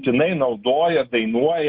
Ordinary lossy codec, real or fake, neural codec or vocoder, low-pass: Opus, 64 kbps; real; none; 5.4 kHz